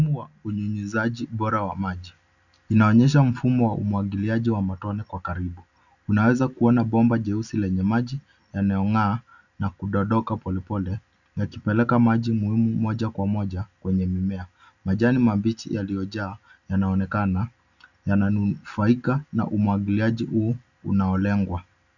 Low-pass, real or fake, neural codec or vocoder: 7.2 kHz; real; none